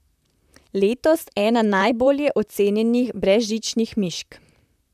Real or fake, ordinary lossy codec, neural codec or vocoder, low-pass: fake; none; vocoder, 44.1 kHz, 128 mel bands, Pupu-Vocoder; 14.4 kHz